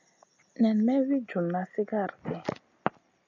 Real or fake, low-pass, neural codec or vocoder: real; 7.2 kHz; none